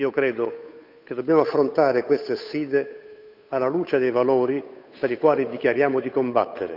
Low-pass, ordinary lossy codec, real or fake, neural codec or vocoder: 5.4 kHz; none; fake; codec, 16 kHz, 8 kbps, FunCodec, trained on Chinese and English, 25 frames a second